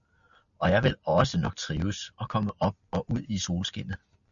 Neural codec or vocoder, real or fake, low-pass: none; real; 7.2 kHz